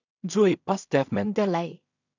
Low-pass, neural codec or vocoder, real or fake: 7.2 kHz; codec, 16 kHz in and 24 kHz out, 0.4 kbps, LongCat-Audio-Codec, two codebook decoder; fake